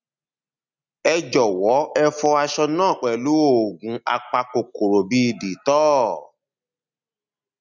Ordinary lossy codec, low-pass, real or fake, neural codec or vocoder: none; 7.2 kHz; real; none